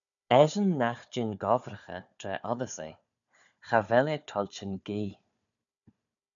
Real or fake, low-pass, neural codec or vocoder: fake; 7.2 kHz; codec, 16 kHz, 4 kbps, FunCodec, trained on Chinese and English, 50 frames a second